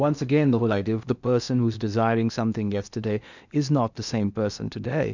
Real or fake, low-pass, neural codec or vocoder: fake; 7.2 kHz; codec, 16 kHz in and 24 kHz out, 0.8 kbps, FocalCodec, streaming, 65536 codes